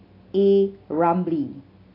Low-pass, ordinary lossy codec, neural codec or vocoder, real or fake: 5.4 kHz; AAC, 32 kbps; none; real